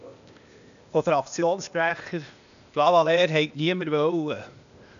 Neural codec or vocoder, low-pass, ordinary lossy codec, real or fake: codec, 16 kHz, 0.8 kbps, ZipCodec; 7.2 kHz; none; fake